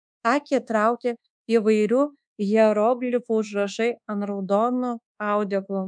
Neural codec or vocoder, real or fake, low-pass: codec, 24 kHz, 1.2 kbps, DualCodec; fake; 9.9 kHz